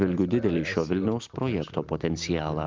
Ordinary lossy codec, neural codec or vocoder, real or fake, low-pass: Opus, 32 kbps; none; real; 7.2 kHz